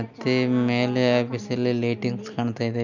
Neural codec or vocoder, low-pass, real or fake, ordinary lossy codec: none; 7.2 kHz; real; none